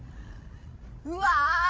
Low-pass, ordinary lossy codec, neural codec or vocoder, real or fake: none; none; codec, 16 kHz, 16 kbps, FreqCodec, larger model; fake